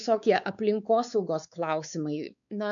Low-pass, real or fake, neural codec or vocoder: 7.2 kHz; fake; codec, 16 kHz, 4 kbps, X-Codec, WavLM features, trained on Multilingual LibriSpeech